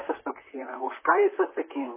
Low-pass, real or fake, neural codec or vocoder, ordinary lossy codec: 3.6 kHz; fake; vocoder, 44.1 kHz, 128 mel bands, Pupu-Vocoder; MP3, 16 kbps